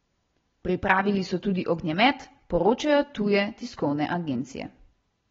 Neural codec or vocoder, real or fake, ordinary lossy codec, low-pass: none; real; AAC, 24 kbps; 7.2 kHz